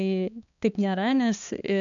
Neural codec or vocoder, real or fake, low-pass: codec, 16 kHz, 2 kbps, X-Codec, HuBERT features, trained on balanced general audio; fake; 7.2 kHz